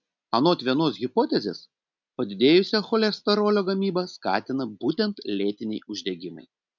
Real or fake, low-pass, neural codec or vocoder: real; 7.2 kHz; none